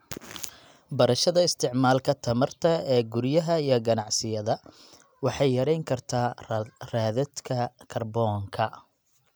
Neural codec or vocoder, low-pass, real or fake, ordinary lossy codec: none; none; real; none